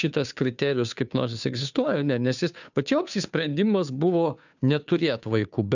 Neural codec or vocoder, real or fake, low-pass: codec, 16 kHz, 2 kbps, FunCodec, trained on Chinese and English, 25 frames a second; fake; 7.2 kHz